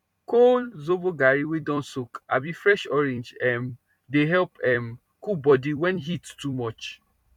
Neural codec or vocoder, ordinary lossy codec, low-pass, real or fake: vocoder, 44.1 kHz, 128 mel bands every 256 samples, BigVGAN v2; none; 19.8 kHz; fake